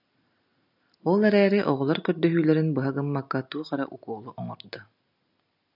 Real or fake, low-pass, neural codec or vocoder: real; 5.4 kHz; none